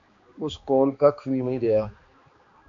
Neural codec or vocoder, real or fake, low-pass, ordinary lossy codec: codec, 16 kHz, 2 kbps, X-Codec, HuBERT features, trained on balanced general audio; fake; 7.2 kHz; MP3, 48 kbps